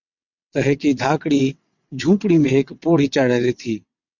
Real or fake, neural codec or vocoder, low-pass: fake; vocoder, 22.05 kHz, 80 mel bands, WaveNeXt; 7.2 kHz